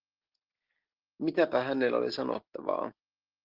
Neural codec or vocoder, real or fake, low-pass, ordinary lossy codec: none; real; 5.4 kHz; Opus, 16 kbps